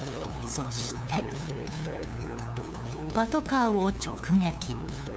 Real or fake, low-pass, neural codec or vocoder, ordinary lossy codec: fake; none; codec, 16 kHz, 2 kbps, FunCodec, trained on LibriTTS, 25 frames a second; none